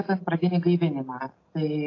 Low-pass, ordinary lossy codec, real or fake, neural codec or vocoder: 7.2 kHz; AAC, 32 kbps; real; none